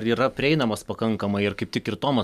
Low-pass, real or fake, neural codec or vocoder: 14.4 kHz; real; none